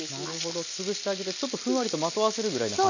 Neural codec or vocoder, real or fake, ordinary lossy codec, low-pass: none; real; none; 7.2 kHz